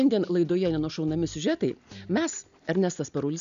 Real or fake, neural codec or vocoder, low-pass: real; none; 7.2 kHz